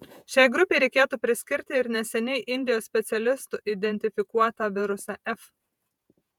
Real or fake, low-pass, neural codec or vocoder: fake; 19.8 kHz; vocoder, 48 kHz, 128 mel bands, Vocos